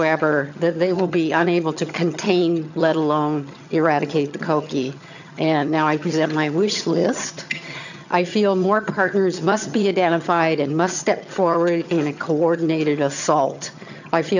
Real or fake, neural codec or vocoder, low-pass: fake; vocoder, 22.05 kHz, 80 mel bands, HiFi-GAN; 7.2 kHz